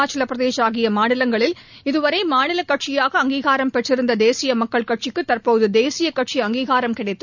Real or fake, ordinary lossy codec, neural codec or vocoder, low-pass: real; none; none; 7.2 kHz